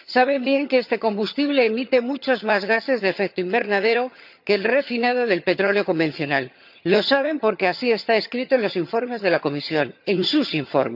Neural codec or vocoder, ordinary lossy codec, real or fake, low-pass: vocoder, 22.05 kHz, 80 mel bands, HiFi-GAN; none; fake; 5.4 kHz